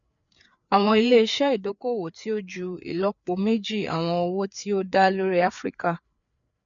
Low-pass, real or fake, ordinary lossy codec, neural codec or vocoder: 7.2 kHz; fake; none; codec, 16 kHz, 4 kbps, FreqCodec, larger model